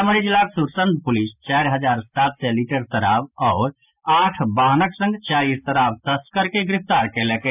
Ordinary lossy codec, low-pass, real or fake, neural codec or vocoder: none; 3.6 kHz; real; none